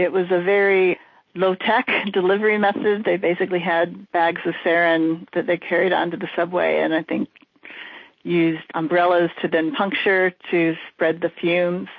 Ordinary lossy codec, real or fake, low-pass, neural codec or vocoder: MP3, 32 kbps; real; 7.2 kHz; none